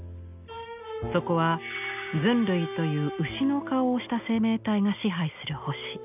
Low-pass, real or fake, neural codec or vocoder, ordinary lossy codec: 3.6 kHz; real; none; none